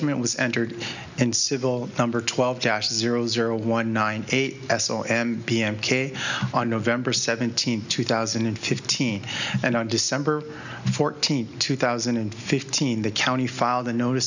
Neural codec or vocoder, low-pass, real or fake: none; 7.2 kHz; real